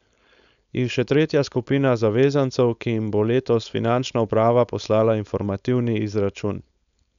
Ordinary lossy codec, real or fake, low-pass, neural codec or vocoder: none; fake; 7.2 kHz; codec, 16 kHz, 4.8 kbps, FACodec